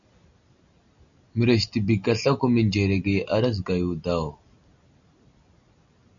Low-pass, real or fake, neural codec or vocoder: 7.2 kHz; real; none